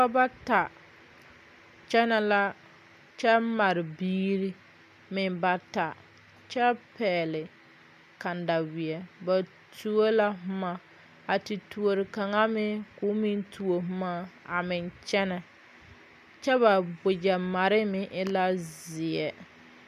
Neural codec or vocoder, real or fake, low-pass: none; real; 14.4 kHz